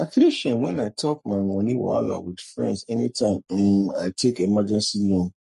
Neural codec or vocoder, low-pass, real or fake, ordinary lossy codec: codec, 44.1 kHz, 3.4 kbps, Pupu-Codec; 14.4 kHz; fake; MP3, 48 kbps